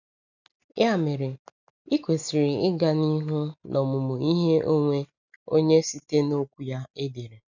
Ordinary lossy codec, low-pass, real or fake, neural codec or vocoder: none; 7.2 kHz; real; none